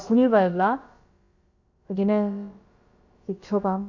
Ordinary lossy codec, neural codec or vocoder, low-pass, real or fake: Opus, 64 kbps; codec, 16 kHz, about 1 kbps, DyCAST, with the encoder's durations; 7.2 kHz; fake